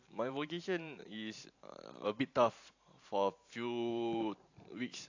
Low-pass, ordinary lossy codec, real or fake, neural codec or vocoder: 7.2 kHz; AAC, 48 kbps; fake; vocoder, 44.1 kHz, 128 mel bands every 512 samples, BigVGAN v2